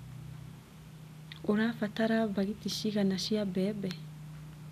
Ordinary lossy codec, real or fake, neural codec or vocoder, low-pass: none; real; none; 14.4 kHz